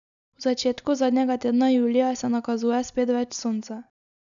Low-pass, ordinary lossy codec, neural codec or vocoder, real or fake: 7.2 kHz; none; none; real